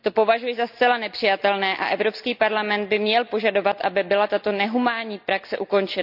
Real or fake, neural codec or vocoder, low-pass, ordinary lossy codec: real; none; 5.4 kHz; none